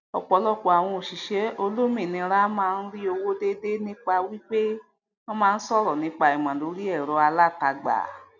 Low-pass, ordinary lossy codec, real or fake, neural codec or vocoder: 7.2 kHz; none; real; none